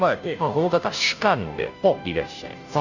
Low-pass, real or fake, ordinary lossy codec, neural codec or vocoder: 7.2 kHz; fake; none; codec, 16 kHz, 0.5 kbps, FunCodec, trained on Chinese and English, 25 frames a second